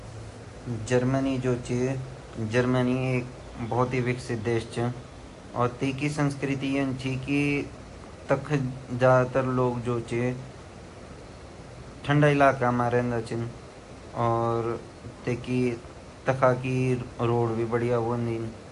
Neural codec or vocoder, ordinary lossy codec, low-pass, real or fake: none; AAC, 48 kbps; 10.8 kHz; real